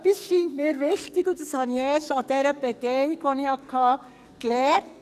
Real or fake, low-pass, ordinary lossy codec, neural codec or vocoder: fake; 14.4 kHz; none; codec, 44.1 kHz, 2.6 kbps, SNAC